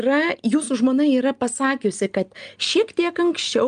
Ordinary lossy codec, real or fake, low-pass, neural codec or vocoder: Opus, 32 kbps; real; 9.9 kHz; none